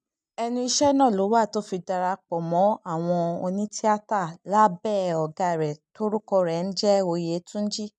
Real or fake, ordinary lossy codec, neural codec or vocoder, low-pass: real; none; none; none